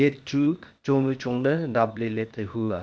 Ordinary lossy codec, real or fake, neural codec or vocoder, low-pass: none; fake; codec, 16 kHz, 0.8 kbps, ZipCodec; none